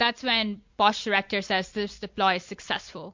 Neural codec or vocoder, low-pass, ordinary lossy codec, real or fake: none; 7.2 kHz; MP3, 48 kbps; real